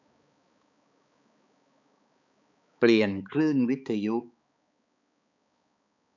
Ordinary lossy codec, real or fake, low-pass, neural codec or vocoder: none; fake; 7.2 kHz; codec, 16 kHz, 4 kbps, X-Codec, HuBERT features, trained on balanced general audio